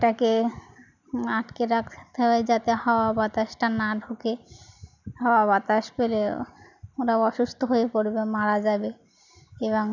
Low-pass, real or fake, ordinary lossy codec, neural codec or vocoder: 7.2 kHz; real; none; none